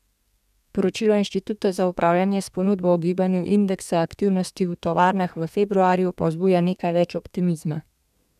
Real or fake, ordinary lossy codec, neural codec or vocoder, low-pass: fake; none; codec, 32 kHz, 1.9 kbps, SNAC; 14.4 kHz